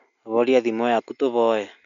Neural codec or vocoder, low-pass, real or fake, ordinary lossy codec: none; 7.2 kHz; real; none